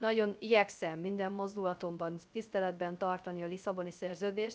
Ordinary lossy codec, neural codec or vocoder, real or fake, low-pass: none; codec, 16 kHz, 0.3 kbps, FocalCodec; fake; none